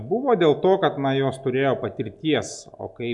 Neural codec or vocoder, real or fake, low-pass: none; real; 10.8 kHz